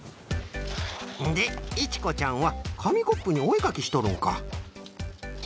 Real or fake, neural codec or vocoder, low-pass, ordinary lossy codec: real; none; none; none